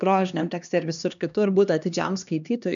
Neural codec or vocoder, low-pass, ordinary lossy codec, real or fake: codec, 16 kHz, 2 kbps, X-Codec, HuBERT features, trained on LibriSpeech; 7.2 kHz; MP3, 96 kbps; fake